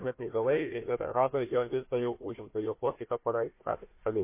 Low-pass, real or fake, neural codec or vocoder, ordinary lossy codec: 3.6 kHz; fake; codec, 16 kHz, 1 kbps, FunCodec, trained on Chinese and English, 50 frames a second; MP3, 24 kbps